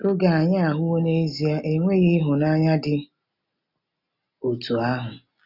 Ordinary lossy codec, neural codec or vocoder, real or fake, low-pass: none; none; real; 5.4 kHz